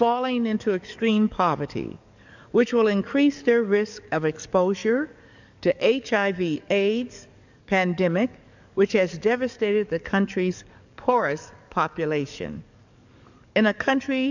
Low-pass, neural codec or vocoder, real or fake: 7.2 kHz; codec, 44.1 kHz, 7.8 kbps, DAC; fake